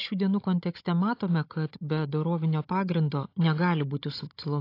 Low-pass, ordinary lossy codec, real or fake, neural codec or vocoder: 5.4 kHz; AAC, 32 kbps; fake; codec, 16 kHz, 16 kbps, FunCodec, trained on Chinese and English, 50 frames a second